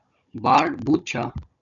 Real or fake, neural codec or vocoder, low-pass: fake; codec, 16 kHz, 16 kbps, FunCodec, trained on Chinese and English, 50 frames a second; 7.2 kHz